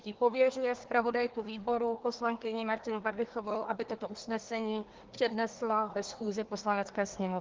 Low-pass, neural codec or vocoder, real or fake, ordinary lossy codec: 7.2 kHz; codec, 24 kHz, 1 kbps, SNAC; fake; Opus, 16 kbps